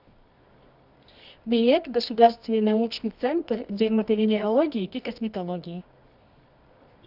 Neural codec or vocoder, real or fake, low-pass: codec, 24 kHz, 0.9 kbps, WavTokenizer, medium music audio release; fake; 5.4 kHz